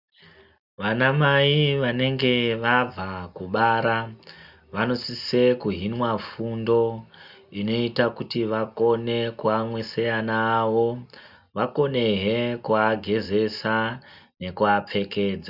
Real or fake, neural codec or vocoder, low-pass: real; none; 5.4 kHz